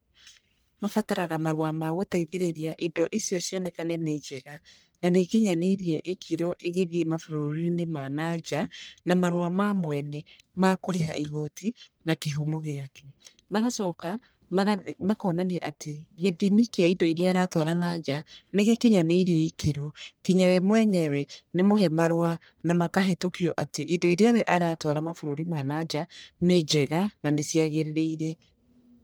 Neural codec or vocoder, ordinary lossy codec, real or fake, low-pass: codec, 44.1 kHz, 1.7 kbps, Pupu-Codec; none; fake; none